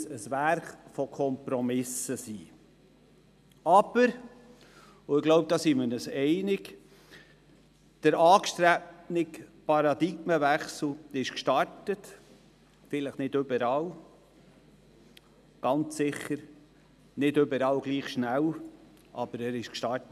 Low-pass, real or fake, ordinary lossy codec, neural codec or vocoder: 14.4 kHz; real; none; none